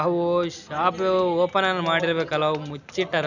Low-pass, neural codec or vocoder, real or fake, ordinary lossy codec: 7.2 kHz; none; real; none